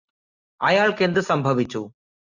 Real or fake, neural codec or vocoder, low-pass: real; none; 7.2 kHz